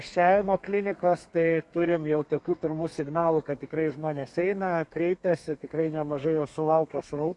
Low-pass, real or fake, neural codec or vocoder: 10.8 kHz; fake; codec, 32 kHz, 1.9 kbps, SNAC